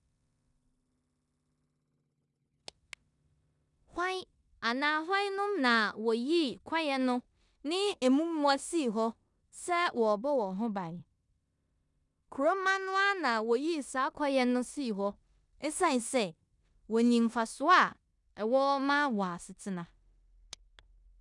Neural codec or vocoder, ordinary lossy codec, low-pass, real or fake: codec, 16 kHz in and 24 kHz out, 0.9 kbps, LongCat-Audio-Codec, four codebook decoder; none; 10.8 kHz; fake